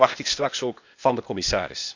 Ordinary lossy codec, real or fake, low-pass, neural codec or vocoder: none; fake; 7.2 kHz; codec, 16 kHz, 0.8 kbps, ZipCodec